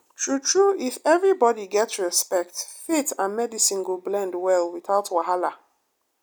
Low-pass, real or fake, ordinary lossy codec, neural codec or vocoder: none; real; none; none